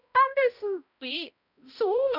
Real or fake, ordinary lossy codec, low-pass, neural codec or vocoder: fake; none; 5.4 kHz; codec, 16 kHz, 0.5 kbps, X-Codec, HuBERT features, trained on balanced general audio